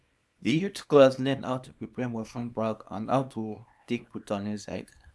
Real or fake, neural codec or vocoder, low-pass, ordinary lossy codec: fake; codec, 24 kHz, 0.9 kbps, WavTokenizer, small release; none; none